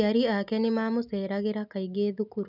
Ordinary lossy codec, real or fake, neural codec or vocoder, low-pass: none; real; none; 5.4 kHz